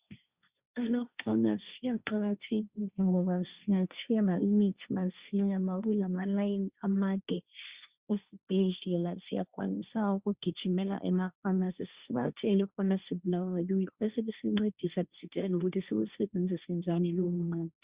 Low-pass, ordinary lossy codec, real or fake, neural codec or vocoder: 3.6 kHz; Opus, 64 kbps; fake; codec, 16 kHz, 1.1 kbps, Voila-Tokenizer